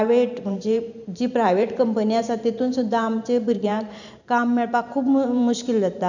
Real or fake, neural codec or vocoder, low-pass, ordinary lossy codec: fake; vocoder, 44.1 kHz, 128 mel bands every 512 samples, BigVGAN v2; 7.2 kHz; none